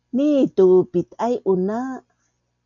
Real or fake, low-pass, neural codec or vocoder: real; 7.2 kHz; none